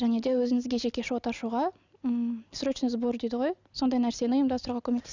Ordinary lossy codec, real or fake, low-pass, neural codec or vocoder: none; real; 7.2 kHz; none